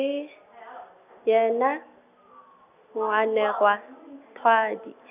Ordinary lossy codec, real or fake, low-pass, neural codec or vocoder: none; real; 3.6 kHz; none